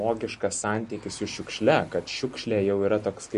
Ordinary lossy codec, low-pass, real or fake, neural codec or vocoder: MP3, 48 kbps; 14.4 kHz; real; none